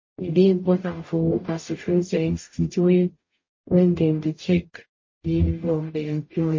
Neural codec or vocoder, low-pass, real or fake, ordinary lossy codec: codec, 44.1 kHz, 0.9 kbps, DAC; 7.2 kHz; fake; MP3, 32 kbps